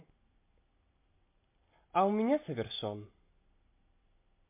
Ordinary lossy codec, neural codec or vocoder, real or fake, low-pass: MP3, 24 kbps; none; real; 3.6 kHz